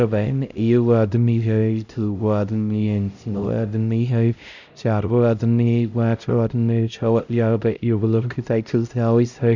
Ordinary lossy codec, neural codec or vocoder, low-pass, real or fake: none; codec, 16 kHz, 0.5 kbps, X-Codec, HuBERT features, trained on LibriSpeech; 7.2 kHz; fake